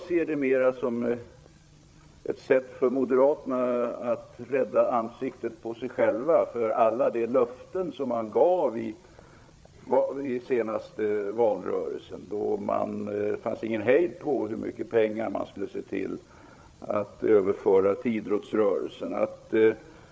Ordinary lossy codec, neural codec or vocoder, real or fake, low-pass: none; codec, 16 kHz, 8 kbps, FreqCodec, larger model; fake; none